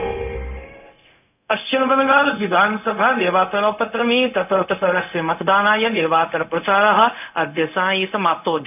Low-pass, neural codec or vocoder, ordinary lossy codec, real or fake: 3.6 kHz; codec, 16 kHz, 0.4 kbps, LongCat-Audio-Codec; none; fake